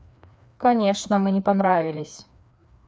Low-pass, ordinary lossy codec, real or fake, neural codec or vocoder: none; none; fake; codec, 16 kHz, 2 kbps, FreqCodec, larger model